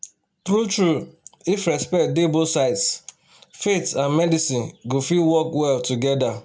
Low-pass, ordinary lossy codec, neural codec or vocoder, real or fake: none; none; none; real